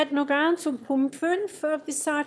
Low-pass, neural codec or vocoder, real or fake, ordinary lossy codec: none; autoencoder, 22.05 kHz, a latent of 192 numbers a frame, VITS, trained on one speaker; fake; none